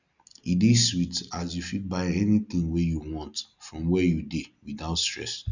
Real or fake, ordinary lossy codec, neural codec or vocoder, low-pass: real; none; none; 7.2 kHz